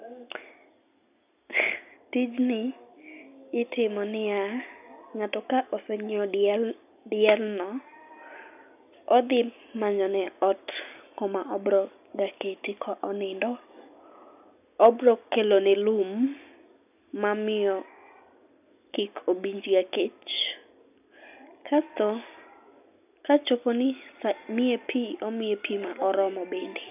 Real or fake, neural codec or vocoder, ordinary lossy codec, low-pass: real; none; none; 3.6 kHz